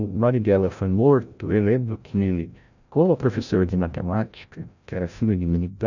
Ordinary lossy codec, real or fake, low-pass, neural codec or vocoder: none; fake; 7.2 kHz; codec, 16 kHz, 0.5 kbps, FreqCodec, larger model